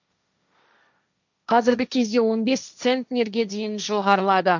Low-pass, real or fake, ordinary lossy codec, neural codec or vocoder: 7.2 kHz; fake; none; codec, 16 kHz, 1.1 kbps, Voila-Tokenizer